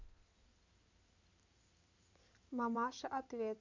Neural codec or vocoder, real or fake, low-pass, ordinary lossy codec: codec, 16 kHz in and 24 kHz out, 2.2 kbps, FireRedTTS-2 codec; fake; 7.2 kHz; MP3, 64 kbps